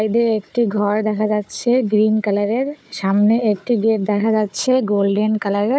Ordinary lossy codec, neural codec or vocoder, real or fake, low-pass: none; codec, 16 kHz, 4 kbps, FunCodec, trained on Chinese and English, 50 frames a second; fake; none